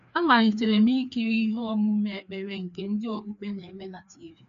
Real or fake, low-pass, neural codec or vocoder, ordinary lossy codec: fake; 7.2 kHz; codec, 16 kHz, 2 kbps, FreqCodec, larger model; none